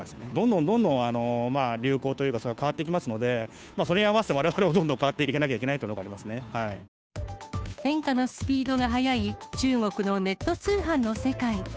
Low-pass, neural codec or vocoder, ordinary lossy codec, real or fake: none; codec, 16 kHz, 2 kbps, FunCodec, trained on Chinese and English, 25 frames a second; none; fake